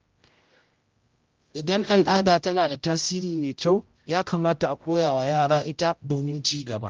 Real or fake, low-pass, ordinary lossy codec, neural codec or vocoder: fake; 7.2 kHz; Opus, 32 kbps; codec, 16 kHz, 0.5 kbps, X-Codec, HuBERT features, trained on general audio